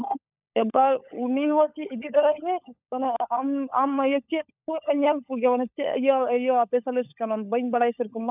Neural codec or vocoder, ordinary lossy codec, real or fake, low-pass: codec, 16 kHz, 16 kbps, FunCodec, trained on LibriTTS, 50 frames a second; none; fake; 3.6 kHz